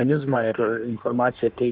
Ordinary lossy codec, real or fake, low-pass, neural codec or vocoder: Opus, 24 kbps; fake; 5.4 kHz; codec, 16 kHz in and 24 kHz out, 1.1 kbps, FireRedTTS-2 codec